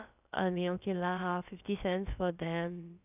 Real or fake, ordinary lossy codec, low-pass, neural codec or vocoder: fake; none; 3.6 kHz; codec, 16 kHz, about 1 kbps, DyCAST, with the encoder's durations